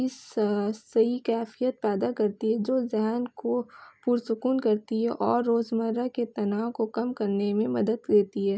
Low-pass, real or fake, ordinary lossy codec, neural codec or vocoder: none; real; none; none